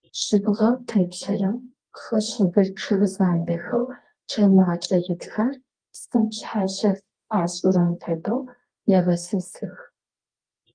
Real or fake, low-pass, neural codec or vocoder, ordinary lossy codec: fake; 9.9 kHz; codec, 24 kHz, 0.9 kbps, WavTokenizer, medium music audio release; Opus, 24 kbps